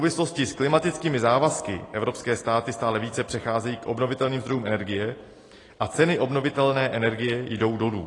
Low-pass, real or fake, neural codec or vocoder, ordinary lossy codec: 10.8 kHz; real; none; AAC, 32 kbps